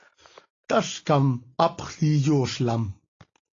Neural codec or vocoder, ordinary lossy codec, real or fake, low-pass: none; AAC, 32 kbps; real; 7.2 kHz